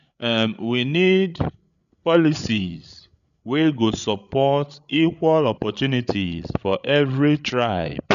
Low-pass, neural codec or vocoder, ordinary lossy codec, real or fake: 7.2 kHz; codec, 16 kHz, 8 kbps, FreqCodec, larger model; none; fake